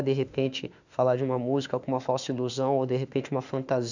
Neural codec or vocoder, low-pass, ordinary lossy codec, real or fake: autoencoder, 48 kHz, 32 numbers a frame, DAC-VAE, trained on Japanese speech; 7.2 kHz; none; fake